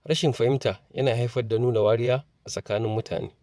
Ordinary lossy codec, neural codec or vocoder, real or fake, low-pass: none; vocoder, 22.05 kHz, 80 mel bands, WaveNeXt; fake; none